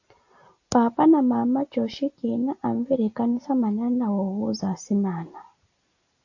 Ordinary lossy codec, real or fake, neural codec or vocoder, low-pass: AAC, 48 kbps; real; none; 7.2 kHz